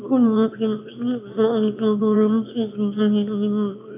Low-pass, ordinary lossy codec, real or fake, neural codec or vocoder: 3.6 kHz; AAC, 24 kbps; fake; autoencoder, 22.05 kHz, a latent of 192 numbers a frame, VITS, trained on one speaker